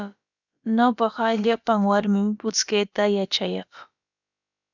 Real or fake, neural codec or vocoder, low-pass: fake; codec, 16 kHz, about 1 kbps, DyCAST, with the encoder's durations; 7.2 kHz